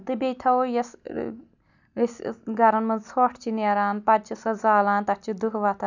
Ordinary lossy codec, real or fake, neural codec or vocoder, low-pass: none; real; none; 7.2 kHz